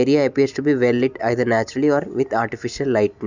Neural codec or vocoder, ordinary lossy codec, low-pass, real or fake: none; none; 7.2 kHz; real